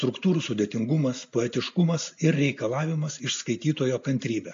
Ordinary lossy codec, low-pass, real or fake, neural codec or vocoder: AAC, 48 kbps; 7.2 kHz; real; none